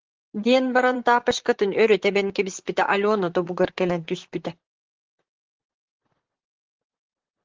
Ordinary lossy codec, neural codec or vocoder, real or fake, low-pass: Opus, 16 kbps; vocoder, 44.1 kHz, 128 mel bands, Pupu-Vocoder; fake; 7.2 kHz